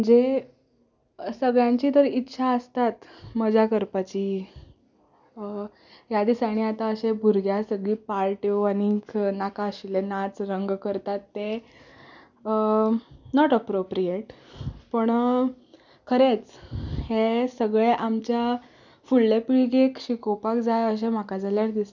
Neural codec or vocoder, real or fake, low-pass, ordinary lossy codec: none; real; 7.2 kHz; none